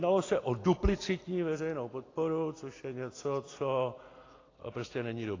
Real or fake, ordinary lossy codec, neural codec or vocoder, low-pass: real; AAC, 32 kbps; none; 7.2 kHz